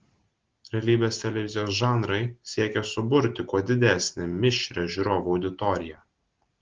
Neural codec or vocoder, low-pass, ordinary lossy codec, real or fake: none; 7.2 kHz; Opus, 16 kbps; real